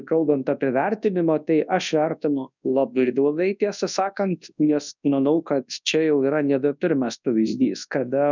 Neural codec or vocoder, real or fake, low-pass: codec, 24 kHz, 0.9 kbps, WavTokenizer, large speech release; fake; 7.2 kHz